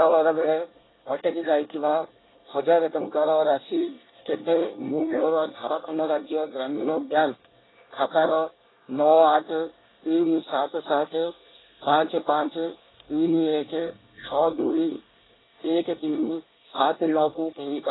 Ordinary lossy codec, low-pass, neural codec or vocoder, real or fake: AAC, 16 kbps; 7.2 kHz; codec, 24 kHz, 1 kbps, SNAC; fake